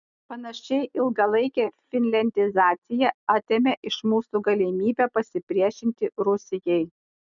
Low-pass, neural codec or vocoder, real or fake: 7.2 kHz; none; real